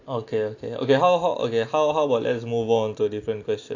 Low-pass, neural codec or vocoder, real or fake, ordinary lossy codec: 7.2 kHz; none; real; AAC, 48 kbps